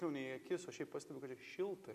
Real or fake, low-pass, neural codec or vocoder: real; 14.4 kHz; none